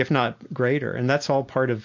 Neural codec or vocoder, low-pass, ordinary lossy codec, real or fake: none; 7.2 kHz; MP3, 48 kbps; real